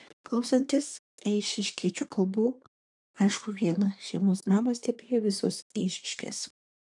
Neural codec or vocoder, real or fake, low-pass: codec, 24 kHz, 1 kbps, SNAC; fake; 10.8 kHz